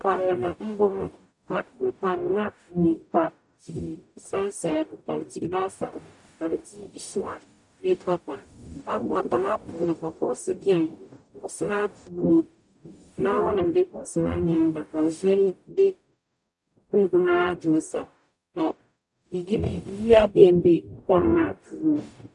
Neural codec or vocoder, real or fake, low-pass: codec, 44.1 kHz, 0.9 kbps, DAC; fake; 10.8 kHz